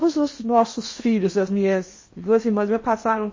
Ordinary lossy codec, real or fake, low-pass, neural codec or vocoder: MP3, 32 kbps; fake; 7.2 kHz; codec, 16 kHz in and 24 kHz out, 0.8 kbps, FocalCodec, streaming, 65536 codes